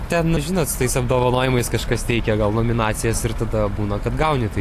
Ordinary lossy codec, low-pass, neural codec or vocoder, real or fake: AAC, 64 kbps; 14.4 kHz; none; real